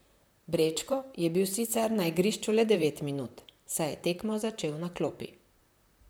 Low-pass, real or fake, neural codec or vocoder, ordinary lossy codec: none; fake; vocoder, 44.1 kHz, 128 mel bands, Pupu-Vocoder; none